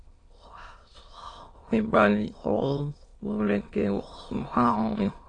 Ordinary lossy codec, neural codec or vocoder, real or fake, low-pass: AAC, 32 kbps; autoencoder, 22.05 kHz, a latent of 192 numbers a frame, VITS, trained on many speakers; fake; 9.9 kHz